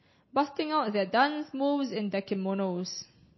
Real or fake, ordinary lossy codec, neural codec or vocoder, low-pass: real; MP3, 24 kbps; none; 7.2 kHz